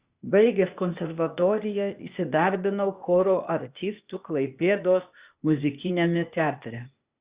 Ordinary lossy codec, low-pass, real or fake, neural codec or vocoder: Opus, 64 kbps; 3.6 kHz; fake; codec, 16 kHz, 0.8 kbps, ZipCodec